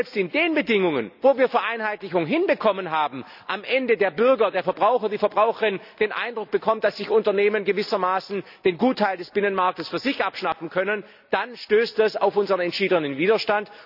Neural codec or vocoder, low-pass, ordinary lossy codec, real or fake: none; 5.4 kHz; none; real